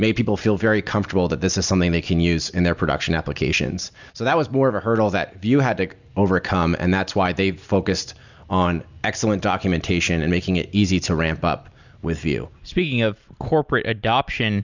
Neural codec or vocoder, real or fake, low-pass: none; real; 7.2 kHz